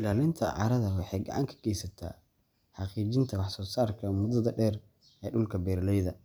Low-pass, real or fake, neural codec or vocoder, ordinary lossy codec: none; real; none; none